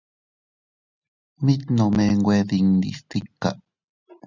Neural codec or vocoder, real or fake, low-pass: none; real; 7.2 kHz